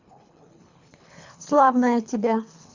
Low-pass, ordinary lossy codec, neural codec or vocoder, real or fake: 7.2 kHz; Opus, 64 kbps; codec, 24 kHz, 3 kbps, HILCodec; fake